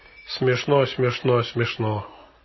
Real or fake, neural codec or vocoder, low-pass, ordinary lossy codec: real; none; 7.2 kHz; MP3, 24 kbps